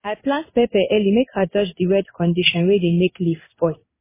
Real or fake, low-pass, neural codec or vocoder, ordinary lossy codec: fake; 3.6 kHz; codec, 16 kHz in and 24 kHz out, 1 kbps, XY-Tokenizer; MP3, 16 kbps